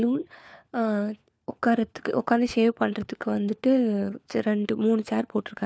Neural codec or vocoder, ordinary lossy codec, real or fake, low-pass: codec, 16 kHz, 4 kbps, FunCodec, trained on LibriTTS, 50 frames a second; none; fake; none